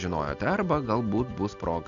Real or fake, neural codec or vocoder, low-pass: real; none; 7.2 kHz